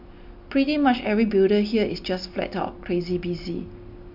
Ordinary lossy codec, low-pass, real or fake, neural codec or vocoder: MP3, 48 kbps; 5.4 kHz; real; none